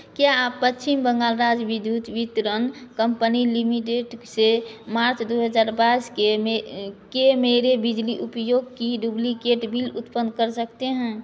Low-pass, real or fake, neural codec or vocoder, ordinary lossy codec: none; real; none; none